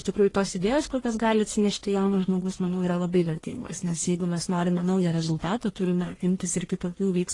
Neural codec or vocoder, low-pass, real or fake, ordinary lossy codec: codec, 44.1 kHz, 1.7 kbps, Pupu-Codec; 10.8 kHz; fake; AAC, 32 kbps